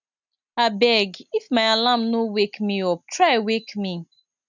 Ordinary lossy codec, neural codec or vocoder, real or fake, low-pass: none; none; real; 7.2 kHz